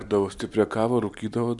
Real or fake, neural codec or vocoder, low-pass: real; none; 10.8 kHz